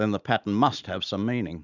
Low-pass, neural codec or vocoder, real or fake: 7.2 kHz; none; real